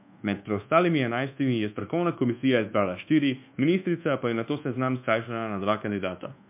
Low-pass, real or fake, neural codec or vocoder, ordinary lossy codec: 3.6 kHz; fake; codec, 24 kHz, 1.2 kbps, DualCodec; MP3, 32 kbps